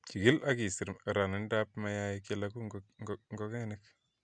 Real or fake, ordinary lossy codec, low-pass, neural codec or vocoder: real; MP3, 96 kbps; 9.9 kHz; none